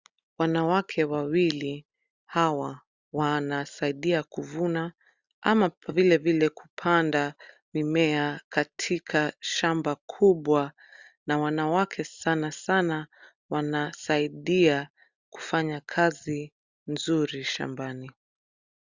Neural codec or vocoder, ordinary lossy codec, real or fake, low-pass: none; Opus, 64 kbps; real; 7.2 kHz